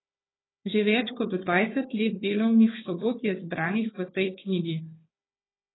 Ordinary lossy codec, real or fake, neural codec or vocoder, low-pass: AAC, 16 kbps; fake; codec, 16 kHz, 4 kbps, FunCodec, trained on Chinese and English, 50 frames a second; 7.2 kHz